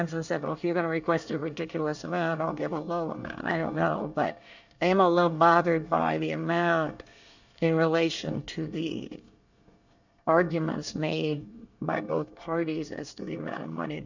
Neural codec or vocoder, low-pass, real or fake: codec, 24 kHz, 1 kbps, SNAC; 7.2 kHz; fake